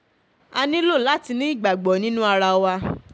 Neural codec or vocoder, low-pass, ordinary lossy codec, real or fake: none; none; none; real